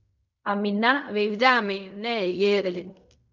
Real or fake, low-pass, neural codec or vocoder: fake; 7.2 kHz; codec, 16 kHz in and 24 kHz out, 0.4 kbps, LongCat-Audio-Codec, fine tuned four codebook decoder